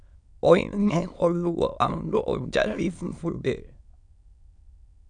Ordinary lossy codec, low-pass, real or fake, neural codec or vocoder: MP3, 96 kbps; 9.9 kHz; fake; autoencoder, 22.05 kHz, a latent of 192 numbers a frame, VITS, trained on many speakers